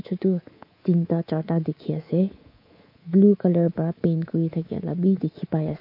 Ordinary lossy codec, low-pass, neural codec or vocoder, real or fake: MP3, 32 kbps; 5.4 kHz; codec, 24 kHz, 3.1 kbps, DualCodec; fake